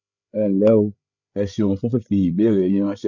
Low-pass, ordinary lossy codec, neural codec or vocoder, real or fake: 7.2 kHz; none; codec, 16 kHz, 8 kbps, FreqCodec, larger model; fake